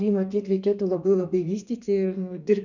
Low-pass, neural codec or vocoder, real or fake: 7.2 kHz; codec, 32 kHz, 1.9 kbps, SNAC; fake